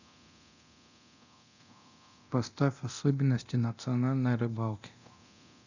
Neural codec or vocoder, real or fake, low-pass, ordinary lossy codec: codec, 24 kHz, 0.9 kbps, DualCodec; fake; 7.2 kHz; none